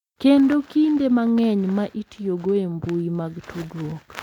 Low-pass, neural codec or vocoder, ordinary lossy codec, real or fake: 19.8 kHz; none; none; real